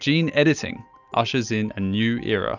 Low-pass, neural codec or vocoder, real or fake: 7.2 kHz; none; real